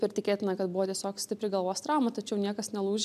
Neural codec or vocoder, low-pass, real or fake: none; 14.4 kHz; real